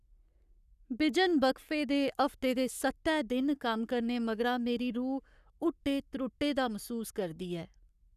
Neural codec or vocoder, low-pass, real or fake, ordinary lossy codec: codec, 44.1 kHz, 7.8 kbps, Pupu-Codec; 14.4 kHz; fake; none